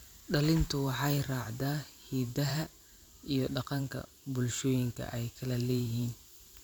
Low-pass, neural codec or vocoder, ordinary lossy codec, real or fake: none; vocoder, 44.1 kHz, 128 mel bands every 512 samples, BigVGAN v2; none; fake